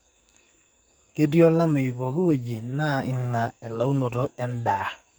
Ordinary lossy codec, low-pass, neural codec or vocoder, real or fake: none; none; codec, 44.1 kHz, 2.6 kbps, SNAC; fake